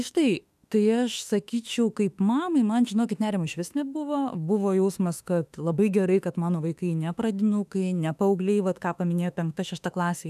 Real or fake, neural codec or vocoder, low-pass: fake; autoencoder, 48 kHz, 32 numbers a frame, DAC-VAE, trained on Japanese speech; 14.4 kHz